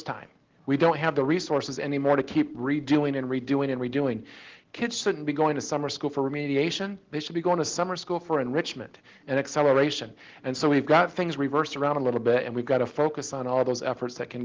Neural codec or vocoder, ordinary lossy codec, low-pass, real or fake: none; Opus, 16 kbps; 7.2 kHz; real